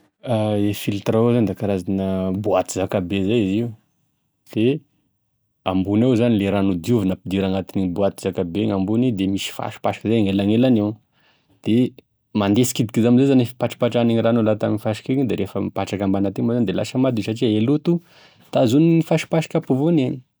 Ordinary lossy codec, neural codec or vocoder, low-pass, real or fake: none; none; none; real